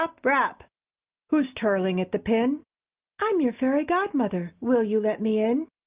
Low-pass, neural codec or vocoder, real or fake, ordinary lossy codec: 3.6 kHz; none; real; Opus, 32 kbps